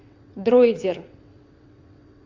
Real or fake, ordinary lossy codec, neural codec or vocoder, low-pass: fake; AAC, 48 kbps; vocoder, 22.05 kHz, 80 mel bands, WaveNeXt; 7.2 kHz